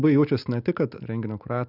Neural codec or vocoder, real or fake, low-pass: none; real; 5.4 kHz